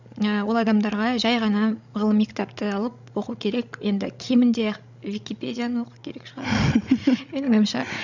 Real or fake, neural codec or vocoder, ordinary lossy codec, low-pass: fake; codec, 16 kHz, 16 kbps, FreqCodec, larger model; none; 7.2 kHz